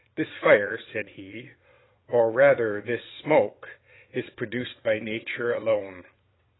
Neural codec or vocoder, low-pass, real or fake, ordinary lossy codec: vocoder, 22.05 kHz, 80 mel bands, Vocos; 7.2 kHz; fake; AAC, 16 kbps